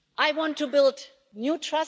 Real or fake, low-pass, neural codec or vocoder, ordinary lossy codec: real; none; none; none